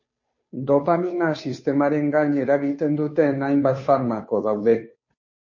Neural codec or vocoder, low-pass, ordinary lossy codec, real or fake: codec, 16 kHz, 2 kbps, FunCodec, trained on Chinese and English, 25 frames a second; 7.2 kHz; MP3, 32 kbps; fake